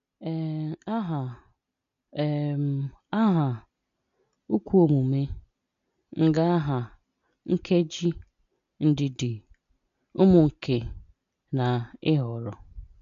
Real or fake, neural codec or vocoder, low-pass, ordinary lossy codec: real; none; 7.2 kHz; none